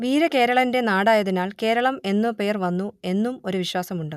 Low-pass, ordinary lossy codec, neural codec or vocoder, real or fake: 14.4 kHz; none; none; real